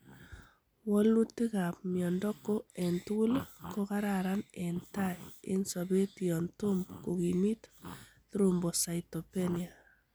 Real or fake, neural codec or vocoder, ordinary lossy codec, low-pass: real; none; none; none